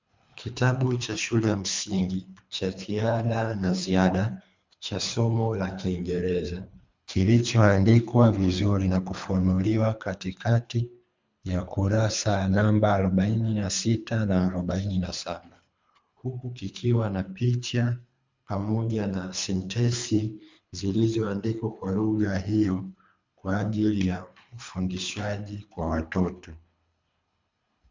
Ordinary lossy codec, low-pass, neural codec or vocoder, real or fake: MP3, 64 kbps; 7.2 kHz; codec, 24 kHz, 3 kbps, HILCodec; fake